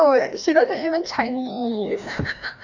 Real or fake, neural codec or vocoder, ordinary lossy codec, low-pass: fake; codec, 16 kHz, 1 kbps, FreqCodec, larger model; none; 7.2 kHz